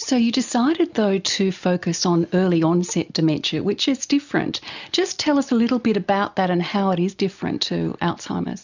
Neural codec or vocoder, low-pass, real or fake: none; 7.2 kHz; real